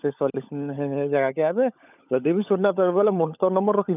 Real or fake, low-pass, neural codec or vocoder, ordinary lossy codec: fake; 3.6 kHz; codec, 16 kHz, 16 kbps, FunCodec, trained on LibriTTS, 50 frames a second; none